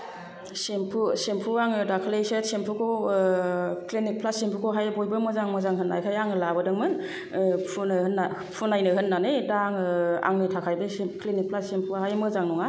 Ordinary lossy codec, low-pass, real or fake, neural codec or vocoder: none; none; real; none